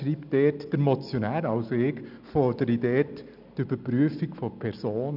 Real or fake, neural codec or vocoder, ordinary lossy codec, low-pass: real; none; none; 5.4 kHz